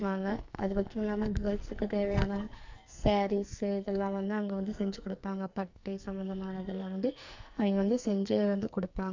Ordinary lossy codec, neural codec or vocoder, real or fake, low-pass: MP3, 64 kbps; codec, 44.1 kHz, 2.6 kbps, SNAC; fake; 7.2 kHz